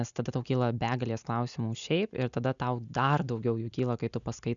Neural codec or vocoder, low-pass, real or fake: none; 7.2 kHz; real